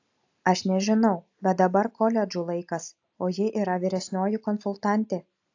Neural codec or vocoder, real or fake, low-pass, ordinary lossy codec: none; real; 7.2 kHz; AAC, 48 kbps